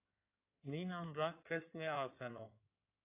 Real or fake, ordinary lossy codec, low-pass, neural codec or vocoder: fake; AAC, 32 kbps; 3.6 kHz; codec, 16 kHz in and 24 kHz out, 2.2 kbps, FireRedTTS-2 codec